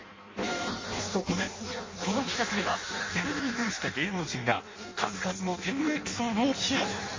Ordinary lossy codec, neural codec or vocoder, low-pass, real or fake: MP3, 32 kbps; codec, 16 kHz in and 24 kHz out, 0.6 kbps, FireRedTTS-2 codec; 7.2 kHz; fake